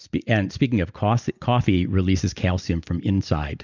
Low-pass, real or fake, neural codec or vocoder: 7.2 kHz; real; none